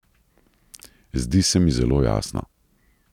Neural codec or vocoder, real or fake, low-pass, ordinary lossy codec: none; real; 19.8 kHz; none